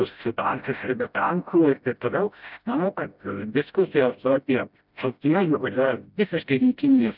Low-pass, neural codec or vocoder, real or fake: 5.4 kHz; codec, 16 kHz, 0.5 kbps, FreqCodec, smaller model; fake